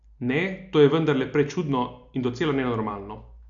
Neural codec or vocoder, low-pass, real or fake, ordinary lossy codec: none; 7.2 kHz; real; none